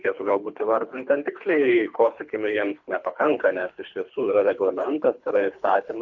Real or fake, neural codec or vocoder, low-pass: fake; codec, 24 kHz, 3 kbps, HILCodec; 7.2 kHz